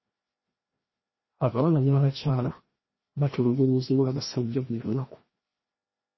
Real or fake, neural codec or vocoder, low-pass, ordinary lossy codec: fake; codec, 16 kHz, 1 kbps, FreqCodec, larger model; 7.2 kHz; MP3, 24 kbps